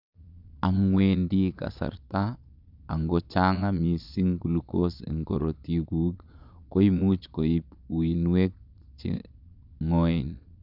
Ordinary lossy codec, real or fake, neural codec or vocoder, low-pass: none; fake; vocoder, 22.05 kHz, 80 mel bands, Vocos; 5.4 kHz